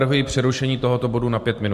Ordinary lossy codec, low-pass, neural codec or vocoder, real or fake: MP3, 64 kbps; 14.4 kHz; none; real